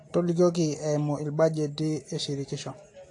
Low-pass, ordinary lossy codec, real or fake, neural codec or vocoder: 10.8 kHz; AAC, 48 kbps; real; none